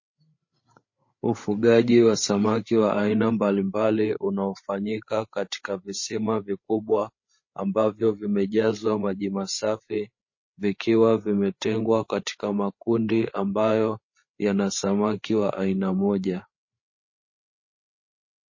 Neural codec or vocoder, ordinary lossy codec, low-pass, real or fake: codec, 16 kHz, 8 kbps, FreqCodec, larger model; MP3, 32 kbps; 7.2 kHz; fake